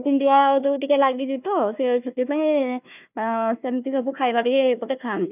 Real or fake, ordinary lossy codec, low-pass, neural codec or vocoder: fake; none; 3.6 kHz; codec, 16 kHz, 1 kbps, FunCodec, trained on Chinese and English, 50 frames a second